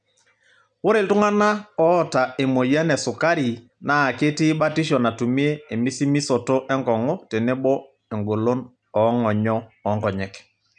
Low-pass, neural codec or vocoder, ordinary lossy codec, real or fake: 10.8 kHz; none; none; real